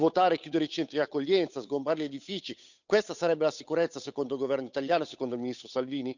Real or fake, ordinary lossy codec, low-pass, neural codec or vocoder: fake; none; 7.2 kHz; codec, 16 kHz, 8 kbps, FunCodec, trained on Chinese and English, 25 frames a second